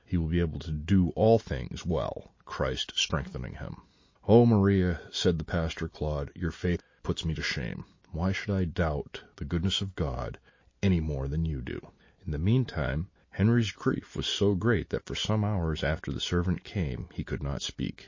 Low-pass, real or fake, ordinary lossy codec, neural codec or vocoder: 7.2 kHz; real; MP3, 32 kbps; none